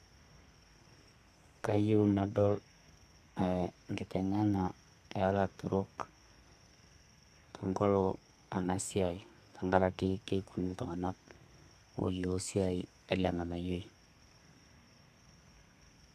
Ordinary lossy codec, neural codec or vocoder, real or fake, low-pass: none; codec, 32 kHz, 1.9 kbps, SNAC; fake; 14.4 kHz